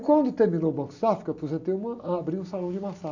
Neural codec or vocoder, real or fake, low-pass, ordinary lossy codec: none; real; 7.2 kHz; none